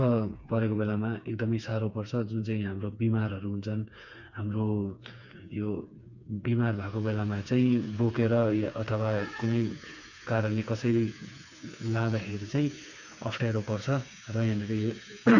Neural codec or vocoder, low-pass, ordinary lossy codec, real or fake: codec, 16 kHz, 4 kbps, FreqCodec, smaller model; 7.2 kHz; none; fake